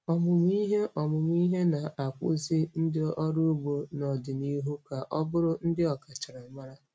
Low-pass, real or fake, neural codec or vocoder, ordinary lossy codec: none; real; none; none